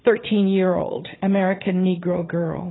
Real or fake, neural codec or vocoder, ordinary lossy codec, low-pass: fake; vocoder, 22.05 kHz, 80 mel bands, Vocos; AAC, 16 kbps; 7.2 kHz